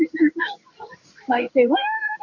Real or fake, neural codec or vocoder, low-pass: fake; codec, 16 kHz in and 24 kHz out, 1 kbps, XY-Tokenizer; 7.2 kHz